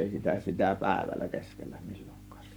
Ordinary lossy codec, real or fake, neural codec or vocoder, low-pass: none; fake; codec, 44.1 kHz, 7.8 kbps, Pupu-Codec; none